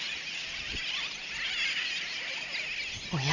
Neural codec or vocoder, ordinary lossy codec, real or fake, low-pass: none; none; real; 7.2 kHz